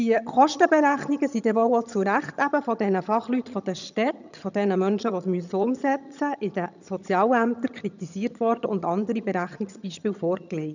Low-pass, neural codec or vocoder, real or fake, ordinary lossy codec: 7.2 kHz; vocoder, 22.05 kHz, 80 mel bands, HiFi-GAN; fake; none